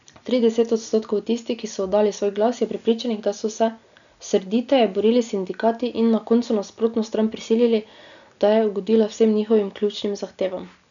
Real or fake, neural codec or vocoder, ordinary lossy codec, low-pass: real; none; none; 7.2 kHz